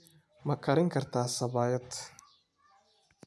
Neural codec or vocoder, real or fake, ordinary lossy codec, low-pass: none; real; none; none